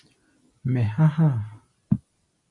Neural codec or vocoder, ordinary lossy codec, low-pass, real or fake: none; MP3, 64 kbps; 10.8 kHz; real